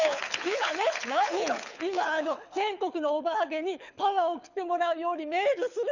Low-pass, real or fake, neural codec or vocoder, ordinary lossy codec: 7.2 kHz; fake; codec, 24 kHz, 6 kbps, HILCodec; none